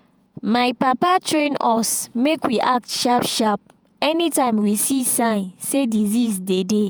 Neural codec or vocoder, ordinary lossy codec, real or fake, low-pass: vocoder, 48 kHz, 128 mel bands, Vocos; none; fake; none